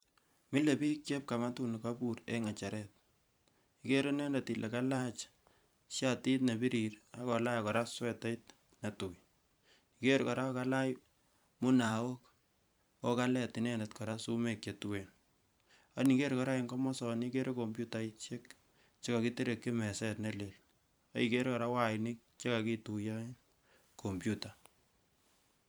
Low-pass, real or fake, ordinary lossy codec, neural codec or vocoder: none; fake; none; vocoder, 44.1 kHz, 128 mel bands every 512 samples, BigVGAN v2